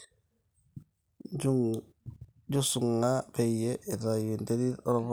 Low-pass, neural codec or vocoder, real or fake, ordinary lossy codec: none; none; real; none